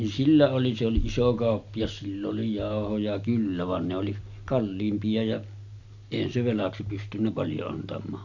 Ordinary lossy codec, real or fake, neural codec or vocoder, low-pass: none; real; none; 7.2 kHz